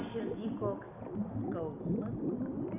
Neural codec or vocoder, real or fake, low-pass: none; real; 3.6 kHz